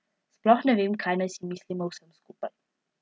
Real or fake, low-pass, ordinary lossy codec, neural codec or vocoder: real; none; none; none